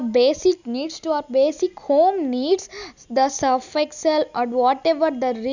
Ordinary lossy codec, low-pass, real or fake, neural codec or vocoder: none; 7.2 kHz; real; none